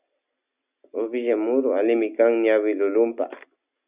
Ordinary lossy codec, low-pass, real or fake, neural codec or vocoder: Opus, 64 kbps; 3.6 kHz; real; none